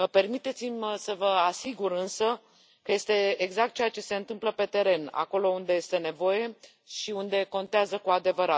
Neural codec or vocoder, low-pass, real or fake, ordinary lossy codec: none; none; real; none